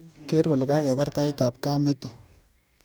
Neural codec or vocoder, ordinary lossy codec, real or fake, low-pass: codec, 44.1 kHz, 2.6 kbps, DAC; none; fake; none